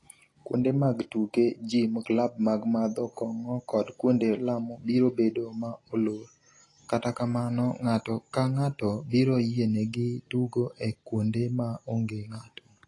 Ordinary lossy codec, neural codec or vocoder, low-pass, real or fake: AAC, 32 kbps; none; 10.8 kHz; real